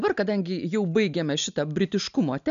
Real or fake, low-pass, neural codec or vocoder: real; 7.2 kHz; none